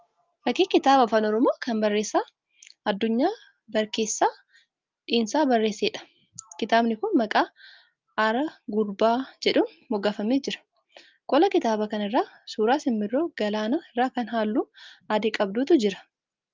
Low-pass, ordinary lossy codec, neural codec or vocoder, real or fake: 7.2 kHz; Opus, 24 kbps; none; real